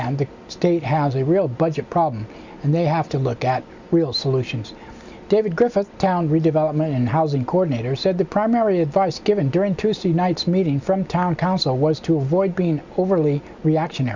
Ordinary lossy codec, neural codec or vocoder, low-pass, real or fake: Opus, 64 kbps; none; 7.2 kHz; real